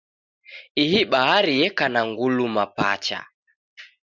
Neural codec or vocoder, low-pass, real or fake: none; 7.2 kHz; real